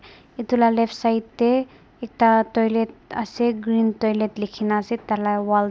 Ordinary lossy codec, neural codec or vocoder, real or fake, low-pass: none; none; real; none